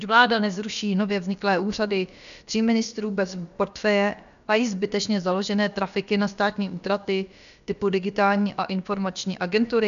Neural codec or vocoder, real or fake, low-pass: codec, 16 kHz, about 1 kbps, DyCAST, with the encoder's durations; fake; 7.2 kHz